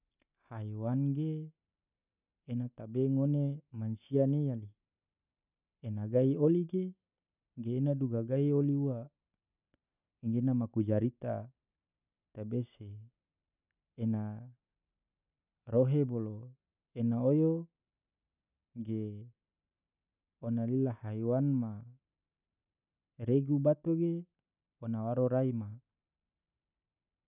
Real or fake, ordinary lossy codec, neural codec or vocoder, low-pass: real; none; none; 3.6 kHz